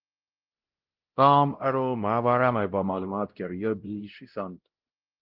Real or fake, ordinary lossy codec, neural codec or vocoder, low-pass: fake; Opus, 16 kbps; codec, 16 kHz, 0.5 kbps, X-Codec, WavLM features, trained on Multilingual LibriSpeech; 5.4 kHz